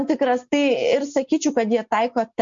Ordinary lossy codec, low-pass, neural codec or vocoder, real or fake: MP3, 48 kbps; 7.2 kHz; none; real